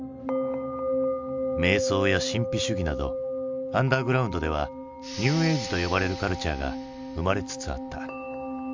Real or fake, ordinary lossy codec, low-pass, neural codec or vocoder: real; MP3, 64 kbps; 7.2 kHz; none